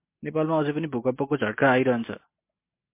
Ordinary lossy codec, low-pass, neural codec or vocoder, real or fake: MP3, 24 kbps; 3.6 kHz; none; real